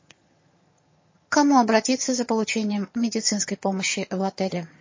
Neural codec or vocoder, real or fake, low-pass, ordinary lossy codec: vocoder, 22.05 kHz, 80 mel bands, HiFi-GAN; fake; 7.2 kHz; MP3, 32 kbps